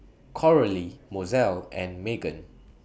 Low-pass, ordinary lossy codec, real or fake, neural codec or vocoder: none; none; real; none